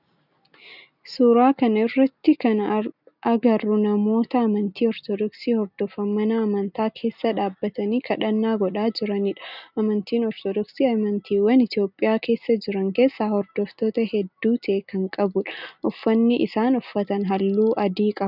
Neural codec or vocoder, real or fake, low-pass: none; real; 5.4 kHz